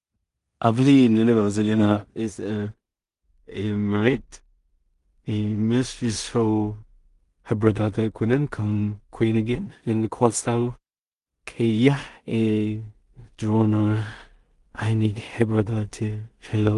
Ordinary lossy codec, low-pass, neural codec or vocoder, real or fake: Opus, 32 kbps; 10.8 kHz; codec, 16 kHz in and 24 kHz out, 0.4 kbps, LongCat-Audio-Codec, two codebook decoder; fake